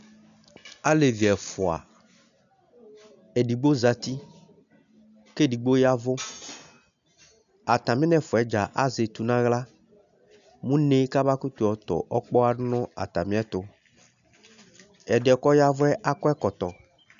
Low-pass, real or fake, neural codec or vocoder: 7.2 kHz; real; none